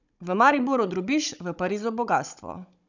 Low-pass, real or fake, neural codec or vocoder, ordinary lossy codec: 7.2 kHz; fake; codec, 16 kHz, 16 kbps, FunCodec, trained on Chinese and English, 50 frames a second; none